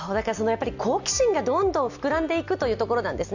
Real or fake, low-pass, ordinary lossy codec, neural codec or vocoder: real; 7.2 kHz; none; none